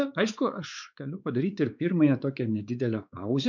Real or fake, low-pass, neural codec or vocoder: fake; 7.2 kHz; codec, 16 kHz, 4 kbps, X-Codec, WavLM features, trained on Multilingual LibriSpeech